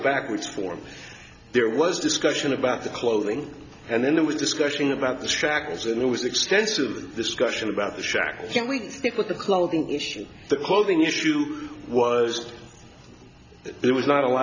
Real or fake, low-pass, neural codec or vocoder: real; 7.2 kHz; none